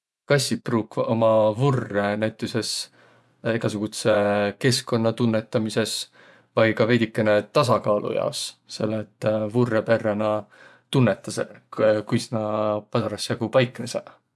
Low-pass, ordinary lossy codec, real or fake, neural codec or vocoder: none; none; fake; vocoder, 24 kHz, 100 mel bands, Vocos